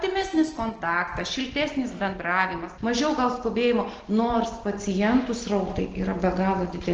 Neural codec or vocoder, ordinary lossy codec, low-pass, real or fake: none; Opus, 16 kbps; 7.2 kHz; real